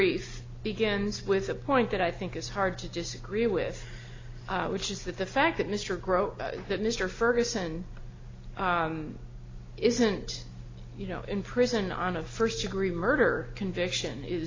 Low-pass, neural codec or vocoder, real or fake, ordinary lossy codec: 7.2 kHz; none; real; AAC, 32 kbps